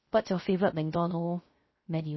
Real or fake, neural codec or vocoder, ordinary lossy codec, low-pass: fake; codec, 16 kHz, 0.3 kbps, FocalCodec; MP3, 24 kbps; 7.2 kHz